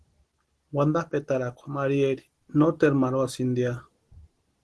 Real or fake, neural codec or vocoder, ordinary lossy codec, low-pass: real; none; Opus, 16 kbps; 10.8 kHz